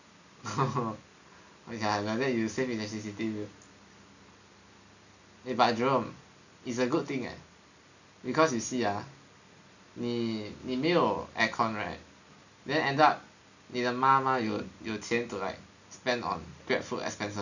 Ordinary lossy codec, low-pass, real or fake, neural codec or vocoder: none; 7.2 kHz; real; none